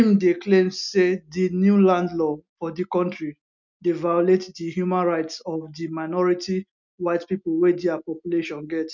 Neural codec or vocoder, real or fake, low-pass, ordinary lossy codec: none; real; 7.2 kHz; none